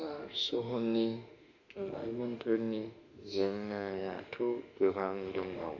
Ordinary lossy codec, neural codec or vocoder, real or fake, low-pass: none; autoencoder, 48 kHz, 32 numbers a frame, DAC-VAE, trained on Japanese speech; fake; 7.2 kHz